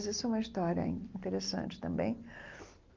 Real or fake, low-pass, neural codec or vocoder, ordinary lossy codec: real; 7.2 kHz; none; Opus, 24 kbps